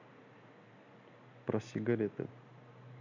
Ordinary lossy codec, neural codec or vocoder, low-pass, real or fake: MP3, 64 kbps; none; 7.2 kHz; real